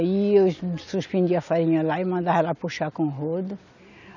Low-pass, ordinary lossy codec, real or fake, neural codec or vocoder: 7.2 kHz; none; real; none